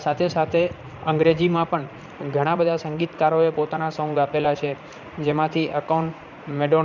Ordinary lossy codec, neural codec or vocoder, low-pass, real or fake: none; vocoder, 44.1 kHz, 80 mel bands, Vocos; 7.2 kHz; fake